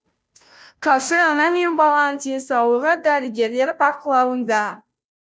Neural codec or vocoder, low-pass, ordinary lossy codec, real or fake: codec, 16 kHz, 0.5 kbps, FunCodec, trained on Chinese and English, 25 frames a second; none; none; fake